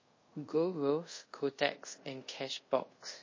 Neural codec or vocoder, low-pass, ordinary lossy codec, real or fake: codec, 24 kHz, 0.5 kbps, DualCodec; 7.2 kHz; MP3, 32 kbps; fake